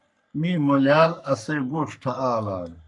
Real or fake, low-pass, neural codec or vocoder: fake; 10.8 kHz; codec, 44.1 kHz, 3.4 kbps, Pupu-Codec